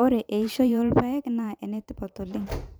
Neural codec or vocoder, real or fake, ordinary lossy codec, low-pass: vocoder, 44.1 kHz, 128 mel bands every 512 samples, BigVGAN v2; fake; none; none